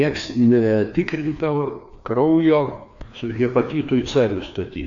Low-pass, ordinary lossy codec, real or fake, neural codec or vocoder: 7.2 kHz; AAC, 64 kbps; fake; codec, 16 kHz, 2 kbps, FreqCodec, larger model